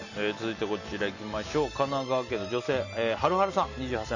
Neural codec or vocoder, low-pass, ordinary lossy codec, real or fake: none; 7.2 kHz; none; real